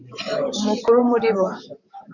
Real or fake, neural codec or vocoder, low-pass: fake; vocoder, 44.1 kHz, 128 mel bands, Pupu-Vocoder; 7.2 kHz